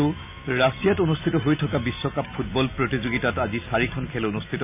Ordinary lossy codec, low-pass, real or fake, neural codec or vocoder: none; 3.6 kHz; real; none